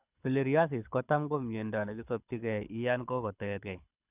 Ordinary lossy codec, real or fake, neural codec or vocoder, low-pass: none; fake; codec, 24 kHz, 6 kbps, HILCodec; 3.6 kHz